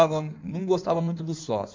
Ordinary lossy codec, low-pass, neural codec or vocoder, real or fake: MP3, 64 kbps; 7.2 kHz; codec, 16 kHz in and 24 kHz out, 1.1 kbps, FireRedTTS-2 codec; fake